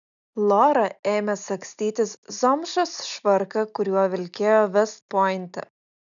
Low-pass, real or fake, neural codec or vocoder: 7.2 kHz; real; none